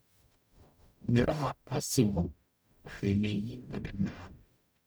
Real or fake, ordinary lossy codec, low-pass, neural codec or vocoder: fake; none; none; codec, 44.1 kHz, 0.9 kbps, DAC